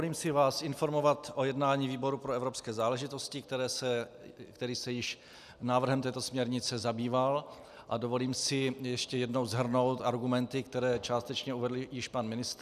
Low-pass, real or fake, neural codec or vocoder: 14.4 kHz; real; none